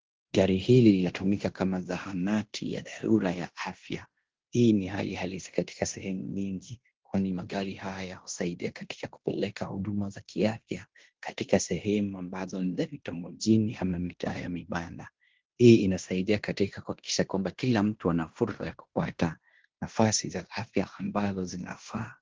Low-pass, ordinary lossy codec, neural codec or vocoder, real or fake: 7.2 kHz; Opus, 16 kbps; codec, 16 kHz in and 24 kHz out, 0.9 kbps, LongCat-Audio-Codec, fine tuned four codebook decoder; fake